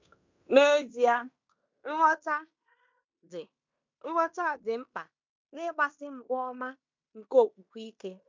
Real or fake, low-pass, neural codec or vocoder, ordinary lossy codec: fake; 7.2 kHz; codec, 16 kHz in and 24 kHz out, 0.9 kbps, LongCat-Audio-Codec, fine tuned four codebook decoder; MP3, 64 kbps